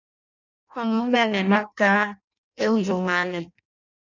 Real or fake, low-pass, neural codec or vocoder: fake; 7.2 kHz; codec, 16 kHz in and 24 kHz out, 0.6 kbps, FireRedTTS-2 codec